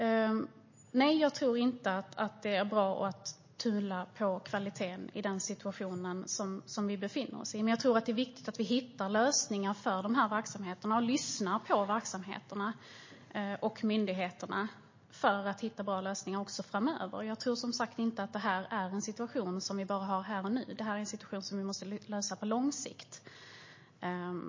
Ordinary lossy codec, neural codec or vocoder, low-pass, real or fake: MP3, 32 kbps; none; 7.2 kHz; real